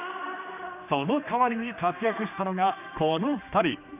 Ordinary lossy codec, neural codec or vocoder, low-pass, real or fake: none; codec, 16 kHz, 2 kbps, X-Codec, HuBERT features, trained on general audio; 3.6 kHz; fake